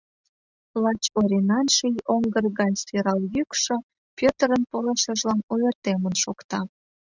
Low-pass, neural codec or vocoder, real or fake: 7.2 kHz; none; real